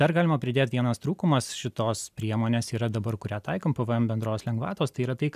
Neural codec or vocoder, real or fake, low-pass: none; real; 14.4 kHz